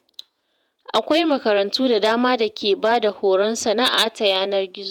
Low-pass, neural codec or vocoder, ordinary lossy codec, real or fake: 19.8 kHz; vocoder, 48 kHz, 128 mel bands, Vocos; none; fake